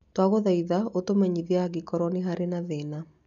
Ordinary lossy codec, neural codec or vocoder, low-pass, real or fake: MP3, 96 kbps; none; 7.2 kHz; real